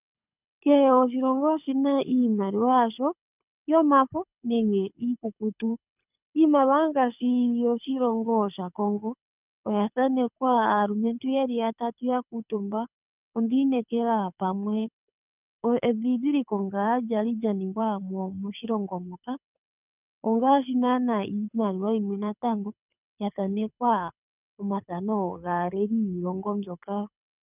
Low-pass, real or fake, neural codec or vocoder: 3.6 kHz; fake; codec, 24 kHz, 6 kbps, HILCodec